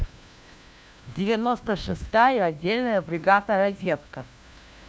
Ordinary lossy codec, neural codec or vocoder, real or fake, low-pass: none; codec, 16 kHz, 1 kbps, FunCodec, trained on LibriTTS, 50 frames a second; fake; none